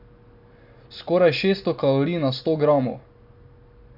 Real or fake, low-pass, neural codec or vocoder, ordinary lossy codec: real; 5.4 kHz; none; AAC, 48 kbps